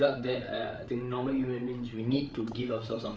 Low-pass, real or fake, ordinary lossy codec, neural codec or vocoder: none; fake; none; codec, 16 kHz, 8 kbps, FreqCodec, larger model